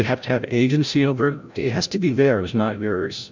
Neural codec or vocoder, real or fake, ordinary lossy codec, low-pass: codec, 16 kHz, 0.5 kbps, FreqCodec, larger model; fake; MP3, 64 kbps; 7.2 kHz